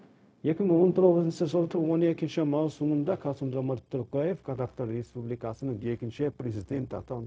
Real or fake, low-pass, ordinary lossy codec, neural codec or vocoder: fake; none; none; codec, 16 kHz, 0.4 kbps, LongCat-Audio-Codec